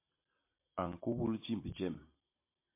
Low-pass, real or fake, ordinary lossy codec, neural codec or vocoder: 3.6 kHz; real; MP3, 24 kbps; none